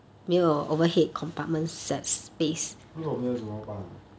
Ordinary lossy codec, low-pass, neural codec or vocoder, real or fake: none; none; none; real